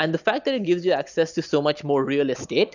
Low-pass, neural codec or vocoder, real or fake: 7.2 kHz; none; real